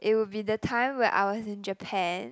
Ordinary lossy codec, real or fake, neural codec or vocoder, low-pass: none; real; none; none